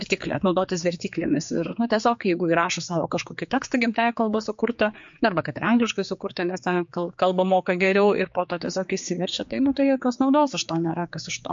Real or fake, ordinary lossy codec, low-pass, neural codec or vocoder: fake; MP3, 48 kbps; 7.2 kHz; codec, 16 kHz, 4 kbps, X-Codec, HuBERT features, trained on general audio